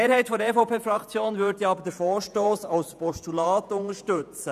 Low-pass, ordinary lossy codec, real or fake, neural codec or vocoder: 14.4 kHz; none; fake; vocoder, 48 kHz, 128 mel bands, Vocos